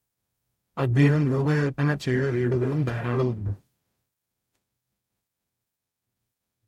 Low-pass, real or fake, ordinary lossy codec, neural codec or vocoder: 19.8 kHz; fake; MP3, 64 kbps; codec, 44.1 kHz, 0.9 kbps, DAC